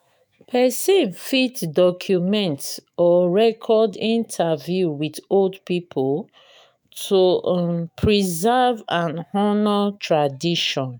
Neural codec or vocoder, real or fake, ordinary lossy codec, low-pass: autoencoder, 48 kHz, 128 numbers a frame, DAC-VAE, trained on Japanese speech; fake; none; none